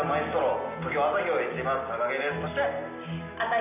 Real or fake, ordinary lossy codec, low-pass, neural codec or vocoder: real; none; 3.6 kHz; none